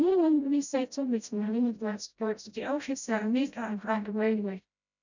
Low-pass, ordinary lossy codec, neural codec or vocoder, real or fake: 7.2 kHz; none; codec, 16 kHz, 0.5 kbps, FreqCodec, smaller model; fake